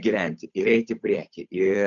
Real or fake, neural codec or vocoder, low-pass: fake; codec, 16 kHz, 8 kbps, FunCodec, trained on Chinese and English, 25 frames a second; 7.2 kHz